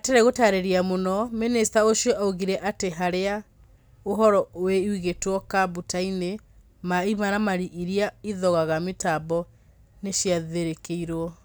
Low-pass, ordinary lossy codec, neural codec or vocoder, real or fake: none; none; none; real